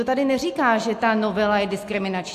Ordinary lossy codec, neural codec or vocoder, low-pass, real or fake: AAC, 64 kbps; none; 14.4 kHz; real